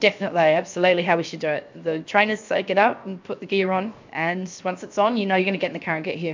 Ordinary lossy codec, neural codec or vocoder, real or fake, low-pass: MP3, 64 kbps; codec, 16 kHz, 0.7 kbps, FocalCodec; fake; 7.2 kHz